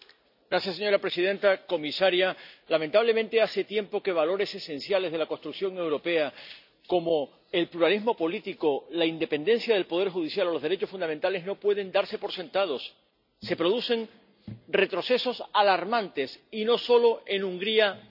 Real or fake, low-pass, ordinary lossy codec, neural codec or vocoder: real; 5.4 kHz; none; none